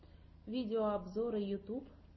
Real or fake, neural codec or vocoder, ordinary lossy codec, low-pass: real; none; MP3, 24 kbps; 7.2 kHz